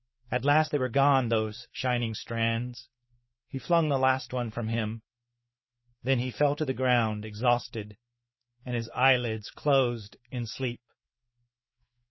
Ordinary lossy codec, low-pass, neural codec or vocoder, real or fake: MP3, 24 kbps; 7.2 kHz; autoencoder, 48 kHz, 128 numbers a frame, DAC-VAE, trained on Japanese speech; fake